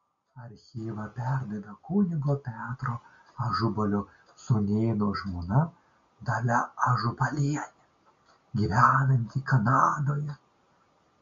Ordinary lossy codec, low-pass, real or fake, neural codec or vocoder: MP3, 48 kbps; 7.2 kHz; real; none